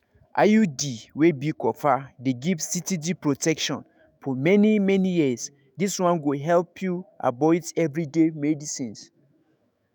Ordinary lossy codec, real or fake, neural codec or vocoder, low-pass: none; fake; autoencoder, 48 kHz, 128 numbers a frame, DAC-VAE, trained on Japanese speech; none